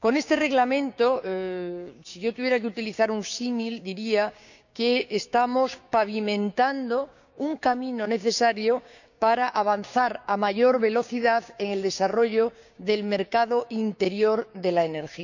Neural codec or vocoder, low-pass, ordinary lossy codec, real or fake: codec, 16 kHz, 6 kbps, DAC; 7.2 kHz; none; fake